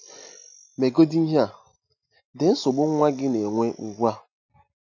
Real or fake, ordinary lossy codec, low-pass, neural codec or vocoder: real; none; 7.2 kHz; none